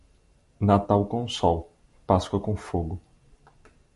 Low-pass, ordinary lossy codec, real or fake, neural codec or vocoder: 14.4 kHz; MP3, 48 kbps; real; none